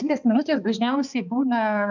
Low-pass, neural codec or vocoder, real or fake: 7.2 kHz; codec, 16 kHz, 2 kbps, X-Codec, HuBERT features, trained on balanced general audio; fake